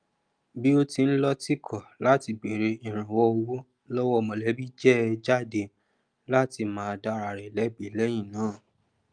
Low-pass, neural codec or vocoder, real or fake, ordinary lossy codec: 9.9 kHz; none; real; Opus, 32 kbps